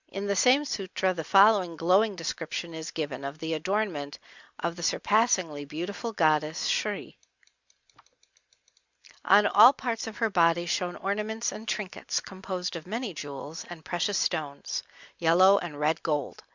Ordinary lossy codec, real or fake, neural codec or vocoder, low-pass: Opus, 64 kbps; real; none; 7.2 kHz